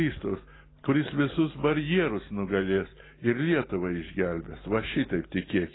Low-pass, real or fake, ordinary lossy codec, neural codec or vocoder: 7.2 kHz; real; AAC, 16 kbps; none